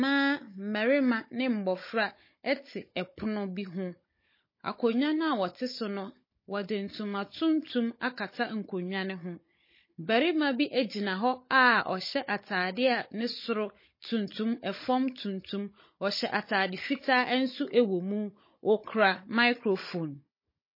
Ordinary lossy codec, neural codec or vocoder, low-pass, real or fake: MP3, 24 kbps; codec, 16 kHz, 16 kbps, FunCodec, trained on Chinese and English, 50 frames a second; 5.4 kHz; fake